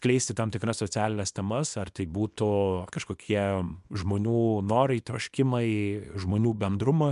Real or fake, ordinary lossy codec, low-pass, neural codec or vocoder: fake; MP3, 96 kbps; 10.8 kHz; codec, 24 kHz, 0.9 kbps, WavTokenizer, small release